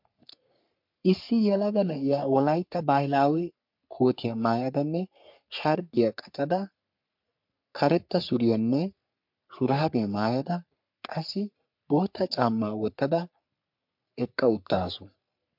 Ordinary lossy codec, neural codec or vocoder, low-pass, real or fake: MP3, 48 kbps; codec, 44.1 kHz, 3.4 kbps, Pupu-Codec; 5.4 kHz; fake